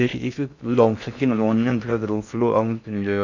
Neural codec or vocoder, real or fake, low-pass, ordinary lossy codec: codec, 16 kHz in and 24 kHz out, 0.6 kbps, FocalCodec, streaming, 2048 codes; fake; 7.2 kHz; none